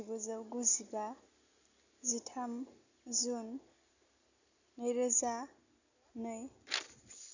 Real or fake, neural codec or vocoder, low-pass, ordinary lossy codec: real; none; 7.2 kHz; none